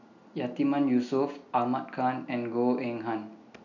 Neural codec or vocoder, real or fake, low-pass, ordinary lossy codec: none; real; 7.2 kHz; none